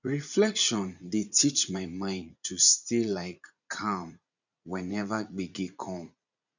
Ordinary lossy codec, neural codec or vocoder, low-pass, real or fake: none; vocoder, 44.1 kHz, 80 mel bands, Vocos; 7.2 kHz; fake